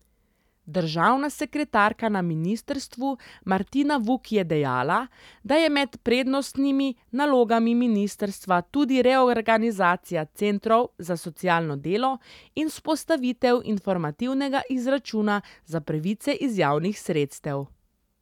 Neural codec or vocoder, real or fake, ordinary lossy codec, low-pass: none; real; none; 19.8 kHz